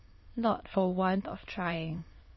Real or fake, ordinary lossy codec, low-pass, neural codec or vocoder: fake; MP3, 24 kbps; 7.2 kHz; autoencoder, 22.05 kHz, a latent of 192 numbers a frame, VITS, trained on many speakers